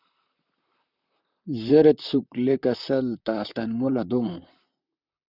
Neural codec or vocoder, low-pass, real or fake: vocoder, 44.1 kHz, 128 mel bands, Pupu-Vocoder; 5.4 kHz; fake